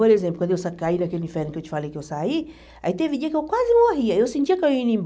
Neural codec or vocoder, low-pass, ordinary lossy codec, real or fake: none; none; none; real